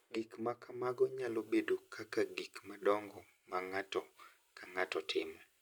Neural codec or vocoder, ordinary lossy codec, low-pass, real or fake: none; none; none; real